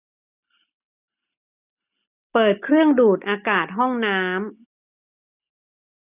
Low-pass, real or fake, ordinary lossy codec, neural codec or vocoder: 3.6 kHz; real; none; none